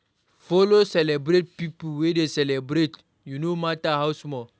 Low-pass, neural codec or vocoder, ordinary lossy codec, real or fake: none; none; none; real